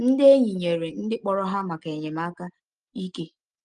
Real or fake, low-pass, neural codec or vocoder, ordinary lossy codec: real; 9.9 kHz; none; Opus, 24 kbps